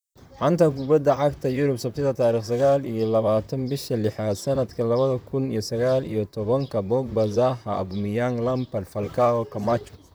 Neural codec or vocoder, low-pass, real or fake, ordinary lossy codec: vocoder, 44.1 kHz, 128 mel bands, Pupu-Vocoder; none; fake; none